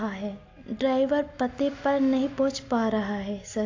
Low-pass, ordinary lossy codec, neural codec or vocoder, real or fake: 7.2 kHz; AAC, 48 kbps; none; real